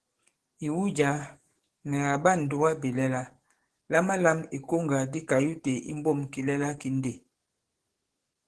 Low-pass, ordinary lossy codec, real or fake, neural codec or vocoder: 10.8 kHz; Opus, 16 kbps; fake; codec, 44.1 kHz, 7.8 kbps, DAC